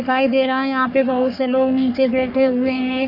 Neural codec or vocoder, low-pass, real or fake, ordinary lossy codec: codec, 44.1 kHz, 3.4 kbps, Pupu-Codec; 5.4 kHz; fake; none